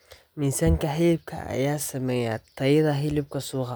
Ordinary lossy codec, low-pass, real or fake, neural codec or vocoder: none; none; real; none